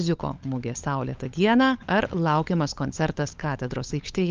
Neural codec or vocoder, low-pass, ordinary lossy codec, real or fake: codec, 16 kHz, 16 kbps, FunCodec, trained on LibriTTS, 50 frames a second; 7.2 kHz; Opus, 24 kbps; fake